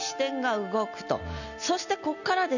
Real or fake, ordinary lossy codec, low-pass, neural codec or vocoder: real; none; 7.2 kHz; none